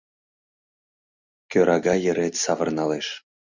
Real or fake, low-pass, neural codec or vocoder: real; 7.2 kHz; none